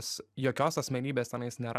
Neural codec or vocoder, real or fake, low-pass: vocoder, 44.1 kHz, 128 mel bands every 256 samples, BigVGAN v2; fake; 14.4 kHz